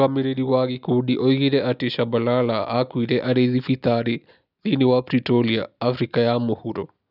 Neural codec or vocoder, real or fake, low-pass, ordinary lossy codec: none; real; 5.4 kHz; none